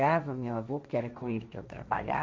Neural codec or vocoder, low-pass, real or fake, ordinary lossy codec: codec, 16 kHz, 1.1 kbps, Voila-Tokenizer; none; fake; none